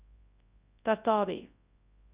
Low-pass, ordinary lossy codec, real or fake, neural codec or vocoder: 3.6 kHz; Opus, 64 kbps; fake; codec, 24 kHz, 0.9 kbps, WavTokenizer, large speech release